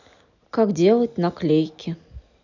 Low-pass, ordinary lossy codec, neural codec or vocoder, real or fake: 7.2 kHz; none; none; real